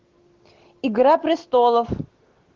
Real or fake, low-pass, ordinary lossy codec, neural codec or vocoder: real; 7.2 kHz; Opus, 16 kbps; none